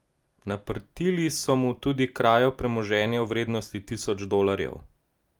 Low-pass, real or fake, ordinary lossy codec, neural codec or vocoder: 19.8 kHz; real; Opus, 32 kbps; none